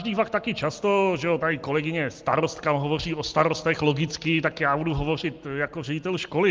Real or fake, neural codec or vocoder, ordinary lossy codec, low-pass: real; none; Opus, 32 kbps; 7.2 kHz